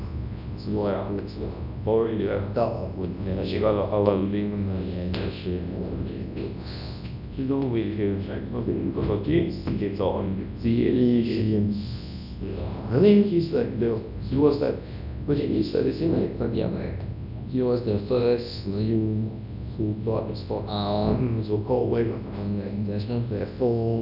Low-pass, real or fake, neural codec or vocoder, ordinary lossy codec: 5.4 kHz; fake; codec, 24 kHz, 0.9 kbps, WavTokenizer, large speech release; none